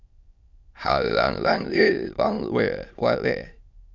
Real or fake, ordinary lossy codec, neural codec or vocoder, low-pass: fake; Opus, 64 kbps; autoencoder, 22.05 kHz, a latent of 192 numbers a frame, VITS, trained on many speakers; 7.2 kHz